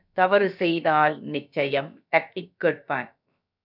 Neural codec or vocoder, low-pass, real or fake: codec, 16 kHz, about 1 kbps, DyCAST, with the encoder's durations; 5.4 kHz; fake